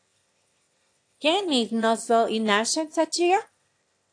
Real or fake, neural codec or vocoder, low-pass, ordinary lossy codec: fake; autoencoder, 22.05 kHz, a latent of 192 numbers a frame, VITS, trained on one speaker; 9.9 kHz; AAC, 48 kbps